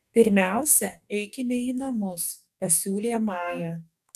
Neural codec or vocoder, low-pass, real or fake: codec, 44.1 kHz, 2.6 kbps, DAC; 14.4 kHz; fake